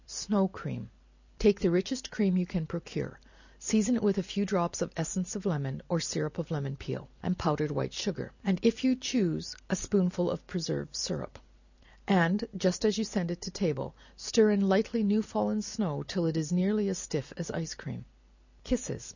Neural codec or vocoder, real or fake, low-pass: none; real; 7.2 kHz